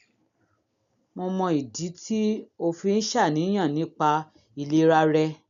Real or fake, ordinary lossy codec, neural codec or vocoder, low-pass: real; none; none; 7.2 kHz